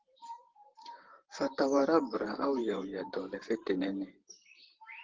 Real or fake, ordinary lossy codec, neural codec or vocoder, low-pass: fake; Opus, 16 kbps; vocoder, 44.1 kHz, 128 mel bands, Pupu-Vocoder; 7.2 kHz